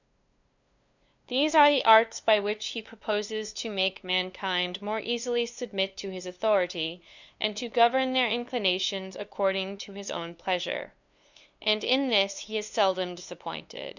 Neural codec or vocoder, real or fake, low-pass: codec, 16 kHz, 2 kbps, FunCodec, trained on LibriTTS, 25 frames a second; fake; 7.2 kHz